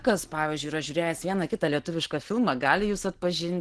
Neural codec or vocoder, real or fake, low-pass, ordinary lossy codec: none; real; 10.8 kHz; Opus, 16 kbps